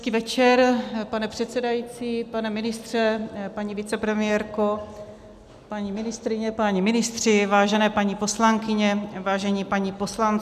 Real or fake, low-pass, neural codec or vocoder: real; 14.4 kHz; none